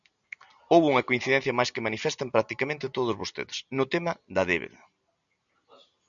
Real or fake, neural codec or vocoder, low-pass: real; none; 7.2 kHz